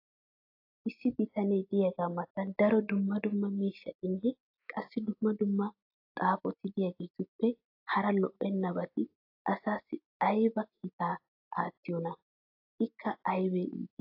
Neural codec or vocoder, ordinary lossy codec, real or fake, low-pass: none; AAC, 32 kbps; real; 5.4 kHz